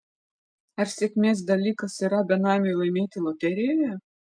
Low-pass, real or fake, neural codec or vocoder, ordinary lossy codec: 9.9 kHz; real; none; MP3, 96 kbps